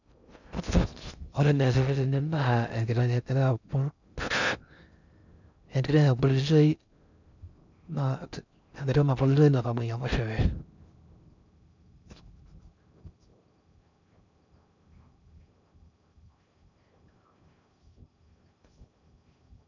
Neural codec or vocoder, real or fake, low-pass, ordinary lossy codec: codec, 16 kHz in and 24 kHz out, 0.6 kbps, FocalCodec, streaming, 2048 codes; fake; 7.2 kHz; none